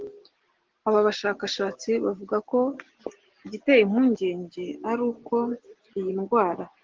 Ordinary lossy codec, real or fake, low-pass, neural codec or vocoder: Opus, 16 kbps; real; 7.2 kHz; none